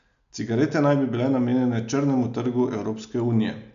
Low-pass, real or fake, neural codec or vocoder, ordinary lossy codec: 7.2 kHz; real; none; none